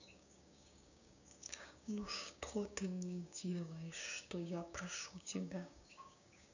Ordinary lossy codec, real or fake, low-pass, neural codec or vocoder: none; fake; 7.2 kHz; codec, 16 kHz, 6 kbps, DAC